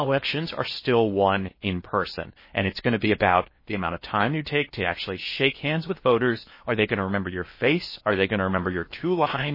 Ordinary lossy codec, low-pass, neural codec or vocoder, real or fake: MP3, 24 kbps; 5.4 kHz; codec, 16 kHz in and 24 kHz out, 0.8 kbps, FocalCodec, streaming, 65536 codes; fake